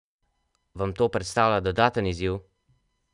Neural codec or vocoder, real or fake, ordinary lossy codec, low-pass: none; real; none; 10.8 kHz